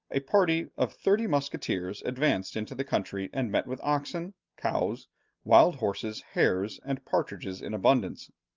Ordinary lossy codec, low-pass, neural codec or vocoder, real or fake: Opus, 24 kbps; 7.2 kHz; none; real